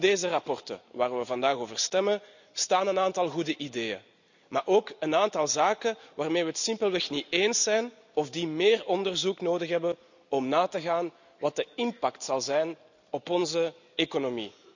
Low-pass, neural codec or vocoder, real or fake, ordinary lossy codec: 7.2 kHz; none; real; none